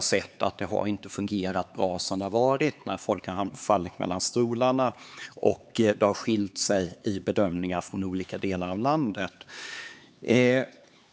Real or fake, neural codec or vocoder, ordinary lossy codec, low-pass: fake; codec, 16 kHz, 4 kbps, X-Codec, HuBERT features, trained on LibriSpeech; none; none